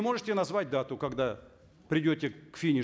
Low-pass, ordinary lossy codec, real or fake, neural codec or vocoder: none; none; real; none